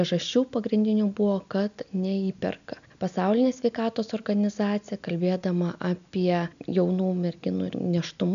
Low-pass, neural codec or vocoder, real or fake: 7.2 kHz; none; real